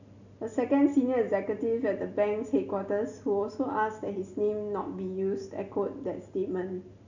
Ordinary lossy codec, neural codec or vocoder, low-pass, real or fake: none; none; 7.2 kHz; real